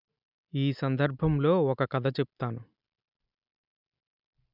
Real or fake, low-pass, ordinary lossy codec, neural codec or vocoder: real; 5.4 kHz; none; none